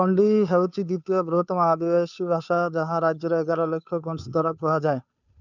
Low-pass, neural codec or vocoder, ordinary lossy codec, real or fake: 7.2 kHz; codec, 16 kHz, 2 kbps, FunCodec, trained on Chinese and English, 25 frames a second; none; fake